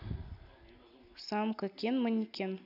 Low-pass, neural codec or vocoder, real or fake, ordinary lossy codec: 5.4 kHz; none; real; none